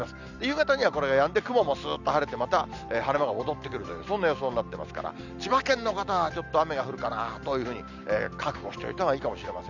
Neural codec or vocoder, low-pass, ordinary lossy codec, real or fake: none; 7.2 kHz; none; real